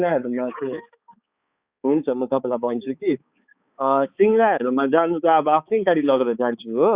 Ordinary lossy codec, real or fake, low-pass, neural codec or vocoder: Opus, 64 kbps; fake; 3.6 kHz; codec, 16 kHz, 4 kbps, X-Codec, HuBERT features, trained on balanced general audio